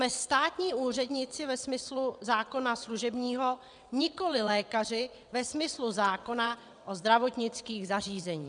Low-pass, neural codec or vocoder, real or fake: 9.9 kHz; vocoder, 22.05 kHz, 80 mel bands, WaveNeXt; fake